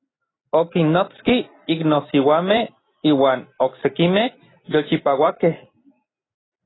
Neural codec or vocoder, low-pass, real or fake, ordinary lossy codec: none; 7.2 kHz; real; AAC, 16 kbps